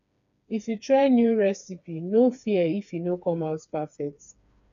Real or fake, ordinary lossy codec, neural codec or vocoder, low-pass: fake; none; codec, 16 kHz, 4 kbps, FreqCodec, smaller model; 7.2 kHz